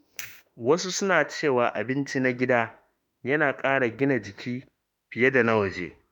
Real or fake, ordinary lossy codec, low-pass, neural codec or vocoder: fake; none; 19.8 kHz; autoencoder, 48 kHz, 32 numbers a frame, DAC-VAE, trained on Japanese speech